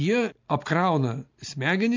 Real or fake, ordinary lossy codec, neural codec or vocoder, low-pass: real; MP3, 64 kbps; none; 7.2 kHz